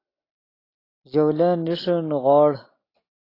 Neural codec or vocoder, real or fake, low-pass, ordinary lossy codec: none; real; 5.4 kHz; AAC, 24 kbps